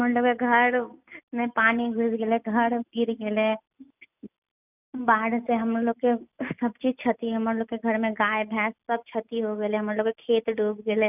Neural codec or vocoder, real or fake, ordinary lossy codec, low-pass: none; real; none; 3.6 kHz